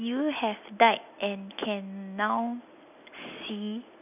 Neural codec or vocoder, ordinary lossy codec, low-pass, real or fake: none; none; 3.6 kHz; real